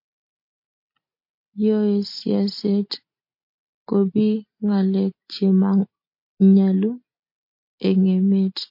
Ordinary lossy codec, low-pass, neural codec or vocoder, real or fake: MP3, 32 kbps; 5.4 kHz; none; real